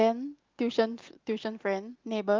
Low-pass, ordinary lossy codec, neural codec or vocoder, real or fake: 7.2 kHz; Opus, 32 kbps; none; real